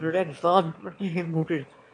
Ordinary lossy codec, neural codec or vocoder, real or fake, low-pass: AAC, 48 kbps; autoencoder, 22.05 kHz, a latent of 192 numbers a frame, VITS, trained on one speaker; fake; 9.9 kHz